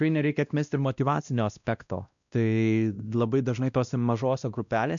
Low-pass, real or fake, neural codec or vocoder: 7.2 kHz; fake; codec, 16 kHz, 1 kbps, X-Codec, WavLM features, trained on Multilingual LibriSpeech